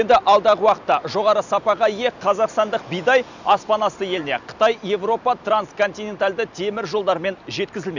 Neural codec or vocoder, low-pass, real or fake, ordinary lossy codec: none; 7.2 kHz; real; none